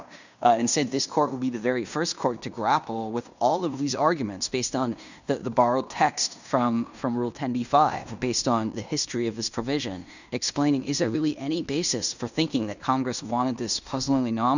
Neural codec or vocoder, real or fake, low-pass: codec, 16 kHz in and 24 kHz out, 0.9 kbps, LongCat-Audio-Codec, fine tuned four codebook decoder; fake; 7.2 kHz